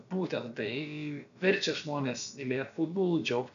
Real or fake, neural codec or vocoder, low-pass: fake; codec, 16 kHz, about 1 kbps, DyCAST, with the encoder's durations; 7.2 kHz